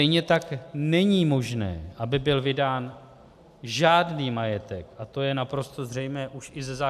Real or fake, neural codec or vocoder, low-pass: real; none; 14.4 kHz